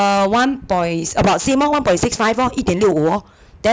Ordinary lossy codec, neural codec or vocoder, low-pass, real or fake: none; none; none; real